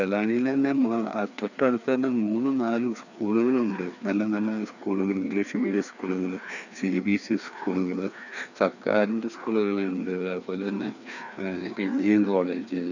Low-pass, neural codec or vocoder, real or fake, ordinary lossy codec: 7.2 kHz; codec, 16 kHz, 2 kbps, FreqCodec, larger model; fake; none